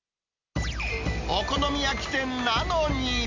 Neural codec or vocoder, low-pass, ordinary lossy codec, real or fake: none; 7.2 kHz; MP3, 48 kbps; real